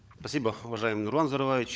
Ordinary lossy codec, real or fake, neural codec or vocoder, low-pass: none; fake; codec, 16 kHz, 16 kbps, FunCodec, trained on LibriTTS, 50 frames a second; none